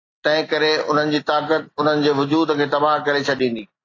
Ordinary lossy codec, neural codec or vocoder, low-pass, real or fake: AAC, 32 kbps; none; 7.2 kHz; real